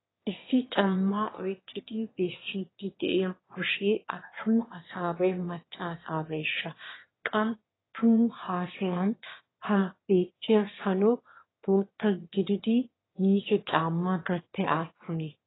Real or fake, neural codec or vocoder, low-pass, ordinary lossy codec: fake; autoencoder, 22.05 kHz, a latent of 192 numbers a frame, VITS, trained on one speaker; 7.2 kHz; AAC, 16 kbps